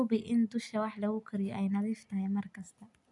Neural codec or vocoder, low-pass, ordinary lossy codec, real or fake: none; 10.8 kHz; none; real